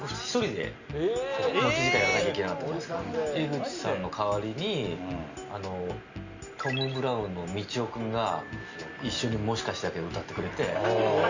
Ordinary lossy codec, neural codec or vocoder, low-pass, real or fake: Opus, 64 kbps; none; 7.2 kHz; real